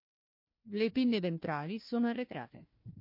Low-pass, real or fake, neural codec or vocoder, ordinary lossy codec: 5.4 kHz; fake; codec, 16 kHz, 1 kbps, FunCodec, trained on Chinese and English, 50 frames a second; MP3, 32 kbps